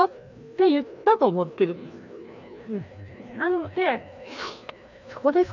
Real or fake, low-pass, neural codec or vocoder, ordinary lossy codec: fake; 7.2 kHz; codec, 16 kHz, 1 kbps, FreqCodec, larger model; none